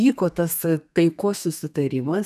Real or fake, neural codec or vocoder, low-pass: fake; codec, 32 kHz, 1.9 kbps, SNAC; 14.4 kHz